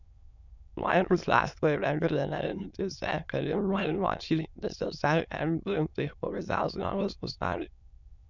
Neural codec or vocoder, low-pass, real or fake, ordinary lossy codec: autoencoder, 22.05 kHz, a latent of 192 numbers a frame, VITS, trained on many speakers; 7.2 kHz; fake; none